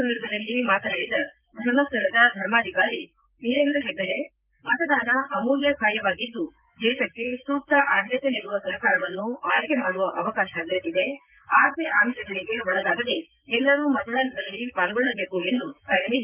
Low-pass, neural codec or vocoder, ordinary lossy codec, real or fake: 3.6 kHz; vocoder, 44.1 kHz, 128 mel bands, Pupu-Vocoder; Opus, 24 kbps; fake